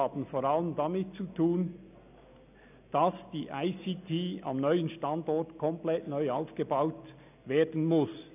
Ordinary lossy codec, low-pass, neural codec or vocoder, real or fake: none; 3.6 kHz; none; real